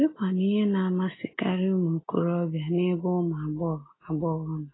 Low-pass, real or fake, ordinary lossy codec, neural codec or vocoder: 7.2 kHz; real; AAC, 16 kbps; none